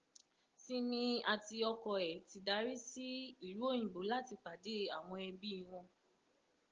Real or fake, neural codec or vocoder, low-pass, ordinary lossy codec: real; none; 7.2 kHz; Opus, 16 kbps